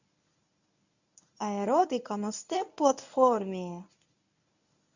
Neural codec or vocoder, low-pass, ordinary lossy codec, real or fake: codec, 24 kHz, 0.9 kbps, WavTokenizer, medium speech release version 1; 7.2 kHz; none; fake